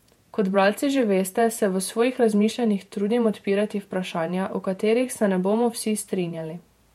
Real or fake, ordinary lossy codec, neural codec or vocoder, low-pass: fake; MP3, 64 kbps; vocoder, 48 kHz, 128 mel bands, Vocos; 19.8 kHz